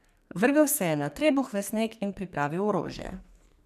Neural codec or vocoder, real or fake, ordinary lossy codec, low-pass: codec, 44.1 kHz, 2.6 kbps, SNAC; fake; none; 14.4 kHz